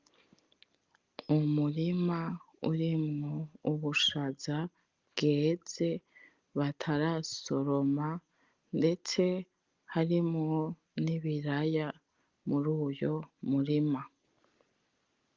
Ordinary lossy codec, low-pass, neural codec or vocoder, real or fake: Opus, 32 kbps; 7.2 kHz; none; real